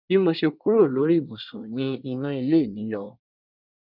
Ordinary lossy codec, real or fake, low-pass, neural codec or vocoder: none; fake; 5.4 kHz; codec, 24 kHz, 1 kbps, SNAC